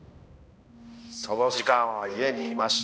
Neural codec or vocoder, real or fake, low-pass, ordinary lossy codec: codec, 16 kHz, 1 kbps, X-Codec, HuBERT features, trained on balanced general audio; fake; none; none